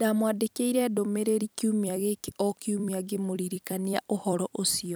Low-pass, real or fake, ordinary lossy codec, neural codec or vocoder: none; fake; none; vocoder, 44.1 kHz, 128 mel bands every 256 samples, BigVGAN v2